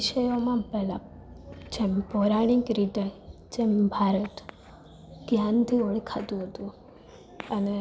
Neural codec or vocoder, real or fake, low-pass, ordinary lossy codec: none; real; none; none